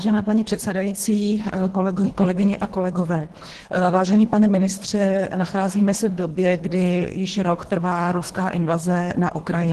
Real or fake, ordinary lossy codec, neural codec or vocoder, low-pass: fake; Opus, 16 kbps; codec, 24 kHz, 1.5 kbps, HILCodec; 10.8 kHz